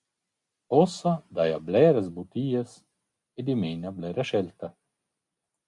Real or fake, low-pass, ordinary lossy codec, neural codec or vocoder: real; 10.8 kHz; MP3, 96 kbps; none